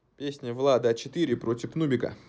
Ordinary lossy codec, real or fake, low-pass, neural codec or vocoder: none; real; none; none